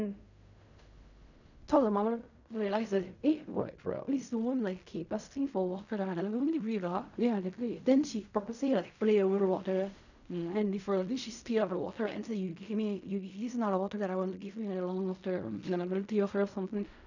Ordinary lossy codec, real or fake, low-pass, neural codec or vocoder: none; fake; 7.2 kHz; codec, 16 kHz in and 24 kHz out, 0.4 kbps, LongCat-Audio-Codec, fine tuned four codebook decoder